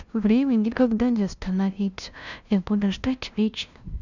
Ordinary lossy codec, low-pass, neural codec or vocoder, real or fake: none; 7.2 kHz; codec, 16 kHz, 0.5 kbps, FunCodec, trained on LibriTTS, 25 frames a second; fake